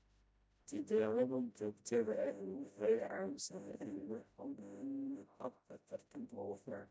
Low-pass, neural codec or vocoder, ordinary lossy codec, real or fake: none; codec, 16 kHz, 0.5 kbps, FreqCodec, smaller model; none; fake